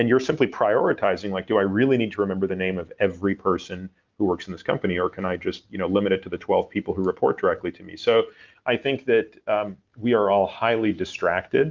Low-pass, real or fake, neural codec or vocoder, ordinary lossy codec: 7.2 kHz; real; none; Opus, 24 kbps